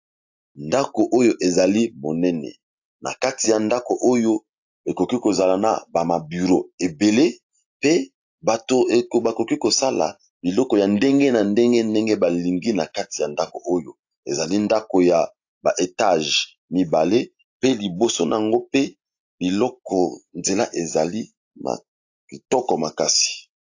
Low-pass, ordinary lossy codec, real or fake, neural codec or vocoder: 7.2 kHz; AAC, 48 kbps; real; none